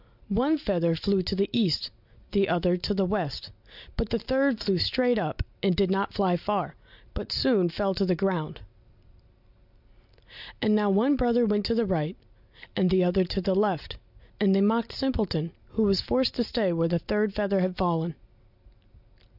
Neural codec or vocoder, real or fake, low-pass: none; real; 5.4 kHz